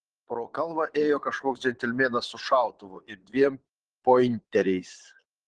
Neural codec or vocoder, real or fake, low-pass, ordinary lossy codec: vocoder, 22.05 kHz, 80 mel bands, Vocos; fake; 9.9 kHz; Opus, 16 kbps